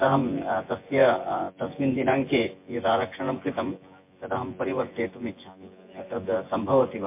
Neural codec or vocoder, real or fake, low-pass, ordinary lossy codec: vocoder, 24 kHz, 100 mel bands, Vocos; fake; 3.6 kHz; MP3, 24 kbps